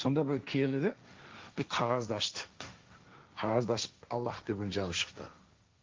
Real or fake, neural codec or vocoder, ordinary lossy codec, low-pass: fake; codec, 16 kHz, 1.1 kbps, Voila-Tokenizer; Opus, 32 kbps; 7.2 kHz